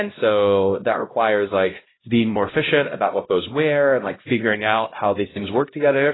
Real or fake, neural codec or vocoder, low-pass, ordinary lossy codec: fake; codec, 16 kHz, 0.5 kbps, X-Codec, HuBERT features, trained on LibriSpeech; 7.2 kHz; AAC, 16 kbps